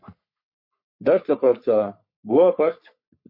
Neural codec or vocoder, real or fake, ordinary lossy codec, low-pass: codec, 44.1 kHz, 3.4 kbps, Pupu-Codec; fake; MP3, 32 kbps; 5.4 kHz